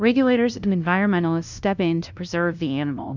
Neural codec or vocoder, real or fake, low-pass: codec, 16 kHz, 0.5 kbps, FunCodec, trained on LibriTTS, 25 frames a second; fake; 7.2 kHz